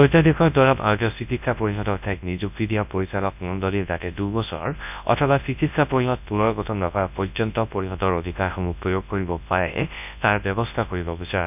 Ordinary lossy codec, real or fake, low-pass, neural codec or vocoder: none; fake; 3.6 kHz; codec, 24 kHz, 0.9 kbps, WavTokenizer, large speech release